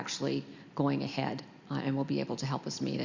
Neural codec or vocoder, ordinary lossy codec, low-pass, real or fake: none; Opus, 64 kbps; 7.2 kHz; real